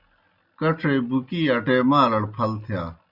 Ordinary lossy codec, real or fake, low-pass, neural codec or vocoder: Opus, 64 kbps; real; 5.4 kHz; none